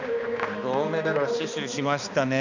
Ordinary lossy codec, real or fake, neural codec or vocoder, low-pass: none; fake; codec, 16 kHz, 2 kbps, X-Codec, HuBERT features, trained on balanced general audio; 7.2 kHz